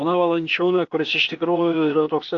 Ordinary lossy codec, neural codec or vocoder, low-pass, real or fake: AAC, 48 kbps; codec, 16 kHz, 0.8 kbps, ZipCodec; 7.2 kHz; fake